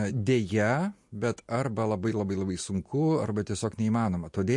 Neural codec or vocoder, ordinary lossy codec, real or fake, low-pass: none; MP3, 48 kbps; real; 10.8 kHz